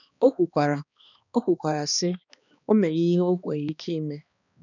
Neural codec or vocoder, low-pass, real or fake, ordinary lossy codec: codec, 16 kHz, 2 kbps, X-Codec, HuBERT features, trained on balanced general audio; 7.2 kHz; fake; none